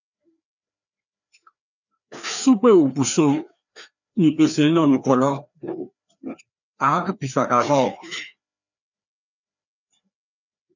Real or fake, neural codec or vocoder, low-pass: fake; codec, 16 kHz, 2 kbps, FreqCodec, larger model; 7.2 kHz